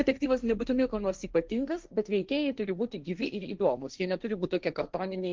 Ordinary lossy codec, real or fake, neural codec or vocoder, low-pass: Opus, 24 kbps; fake; codec, 16 kHz, 1.1 kbps, Voila-Tokenizer; 7.2 kHz